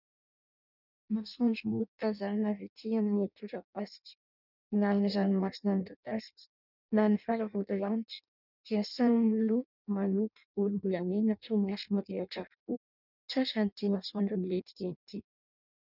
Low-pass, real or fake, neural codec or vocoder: 5.4 kHz; fake; codec, 16 kHz in and 24 kHz out, 0.6 kbps, FireRedTTS-2 codec